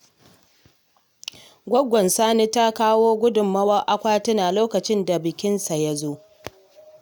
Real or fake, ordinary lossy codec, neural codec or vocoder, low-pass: real; none; none; none